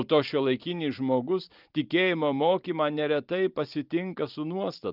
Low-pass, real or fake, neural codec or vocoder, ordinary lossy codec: 5.4 kHz; fake; vocoder, 44.1 kHz, 128 mel bands every 512 samples, BigVGAN v2; Opus, 32 kbps